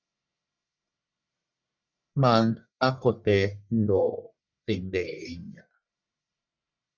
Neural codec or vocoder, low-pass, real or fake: codec, 44.1 kHz, 1.7 kbps, Pupu-Codec; 7.2 kHz; fake